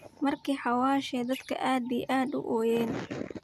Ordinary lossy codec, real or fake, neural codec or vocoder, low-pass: none; real; none; 14.4 kHz